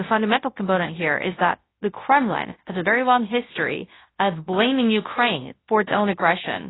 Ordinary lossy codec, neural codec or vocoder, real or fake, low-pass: AAC, 16 kbps; codec, 24 kHz, 0.9 kbps, WavTokenizer, large speech release; fake; 7.2 kHz